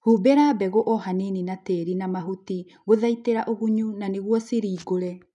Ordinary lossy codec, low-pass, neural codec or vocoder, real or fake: none; 10.8 kHz; none; real